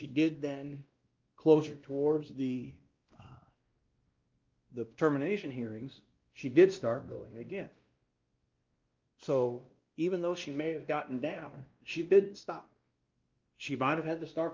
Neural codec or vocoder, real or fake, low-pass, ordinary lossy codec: codec, 16 kHz, 1 kbps, X-Codec, WavLM features, trained on Multilingual LibriSpeech; fake; 7.2 kHz; Opus, 32 kbps